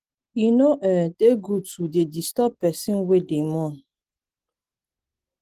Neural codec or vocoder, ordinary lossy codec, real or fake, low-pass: none; Opus, 16 kbps; real; 14.4 kHz